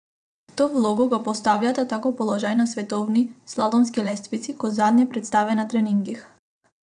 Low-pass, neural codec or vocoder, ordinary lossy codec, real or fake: 9.9 kHz; vocoder, 22.05 kHz, 80 mel bands, WaveNeXt; none; fake